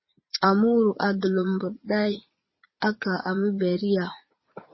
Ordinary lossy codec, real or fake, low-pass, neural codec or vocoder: MP3, 24 kbps; fake; 7.2 kHz; vocoder, 22.05 kHz, 80 mel bands, Vocos